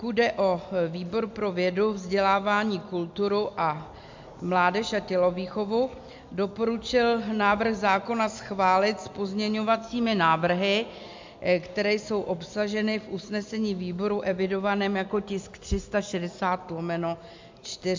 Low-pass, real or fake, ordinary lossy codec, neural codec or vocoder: 7.2 kHz; real; MP3, 64 kbps; none